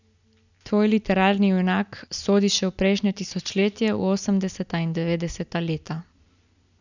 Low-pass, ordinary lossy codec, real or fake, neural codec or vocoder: 7.2 kHz; none; real; none